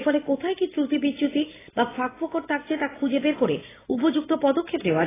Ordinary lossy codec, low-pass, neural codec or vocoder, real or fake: AAC, 16 kbps; 3.6 kHz; none; real